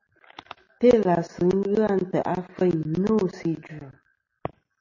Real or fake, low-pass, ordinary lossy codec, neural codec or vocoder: real; 7.2 kHz; MP3, 32 kbps; none